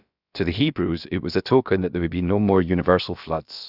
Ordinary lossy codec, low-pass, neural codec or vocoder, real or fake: none; 5.4 kHz; codec, 16 kHz, about 1 kbps, DyCAST, with the encoder's durations; fake